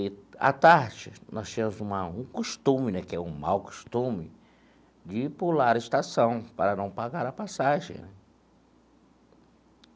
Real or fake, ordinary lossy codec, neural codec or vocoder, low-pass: real; none; none; none